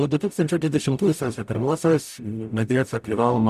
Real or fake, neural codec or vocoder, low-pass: fake; codec, 44.1 kHz, 0.9 kbps, DAC; 14.4 kHz